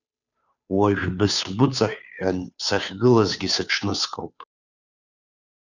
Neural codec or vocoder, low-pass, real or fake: codec, 16 kHz, 2 kbps, FunCodec, trained on Chinese and English, 25 frames a second; 7.2 kHz; fake